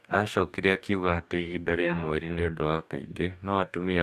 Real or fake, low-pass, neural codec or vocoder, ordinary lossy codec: fake; 14.4 kHz; codec, 44.1 kHz, 2.6 kbps, DAC; none